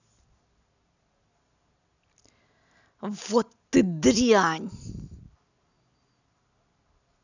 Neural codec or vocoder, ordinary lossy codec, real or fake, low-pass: none; none; real; 7.2 kHz